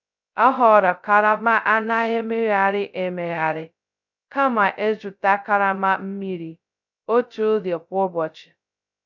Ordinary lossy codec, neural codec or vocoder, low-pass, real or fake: none; codec, 16 kHz, 0.2 kbps, FocalCodec; 7.2 kHz; fake